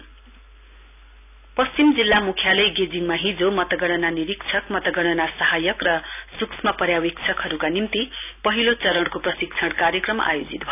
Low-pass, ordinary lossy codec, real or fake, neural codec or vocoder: 3.6 kHz; none; real; none